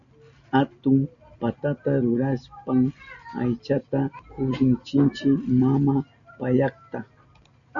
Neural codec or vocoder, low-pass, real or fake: none; 7.2 kHz; real